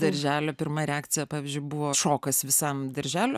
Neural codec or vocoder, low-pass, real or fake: none; 14.4 kHz; real